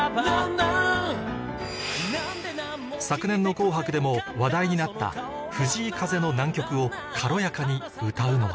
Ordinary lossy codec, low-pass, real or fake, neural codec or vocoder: none; none; real; none